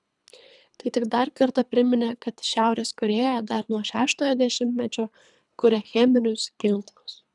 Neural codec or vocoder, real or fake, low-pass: codec, 24 kHz, 3 kbps, HILCodec; fake; 10.8 kHz